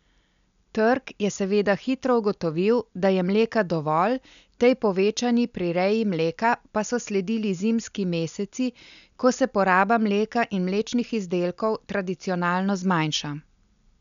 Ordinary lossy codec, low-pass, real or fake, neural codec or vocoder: none; 7.2 kHz; real; none